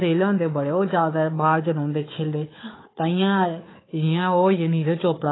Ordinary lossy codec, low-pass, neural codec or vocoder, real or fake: AAC, 16 kbps; 7.2 kHz; codec, 16 kHz, 4 kbps, FunCodec, trained on Chinese and English, 50 frames a second; fake